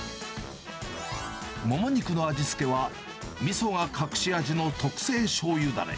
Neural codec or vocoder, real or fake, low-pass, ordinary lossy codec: none; real; none; none